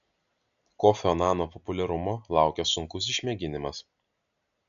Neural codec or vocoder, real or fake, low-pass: none; real; 7.2 kHz